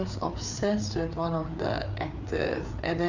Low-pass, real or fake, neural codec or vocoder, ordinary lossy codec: 7.2 kHz; fake; codec, 16 kHz, 4 kbps, FreqCodec, larger model; none